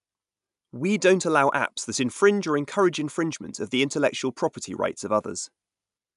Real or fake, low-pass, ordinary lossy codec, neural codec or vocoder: real; 10.8 kHz; MP3, 96 kbps; none